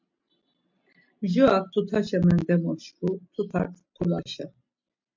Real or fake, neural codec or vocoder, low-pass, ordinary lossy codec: real; none; 7.2 kHz; MP3, 64 kbps